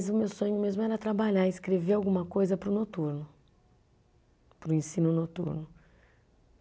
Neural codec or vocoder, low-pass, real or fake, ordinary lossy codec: none; none; real; none